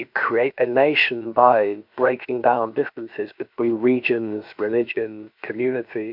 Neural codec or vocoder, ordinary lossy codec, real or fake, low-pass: codec, 16 kHz, about 1 kbps, DyCAST, with the encoder's durations; MP3, 48 kbps; fake; 5.4 kHz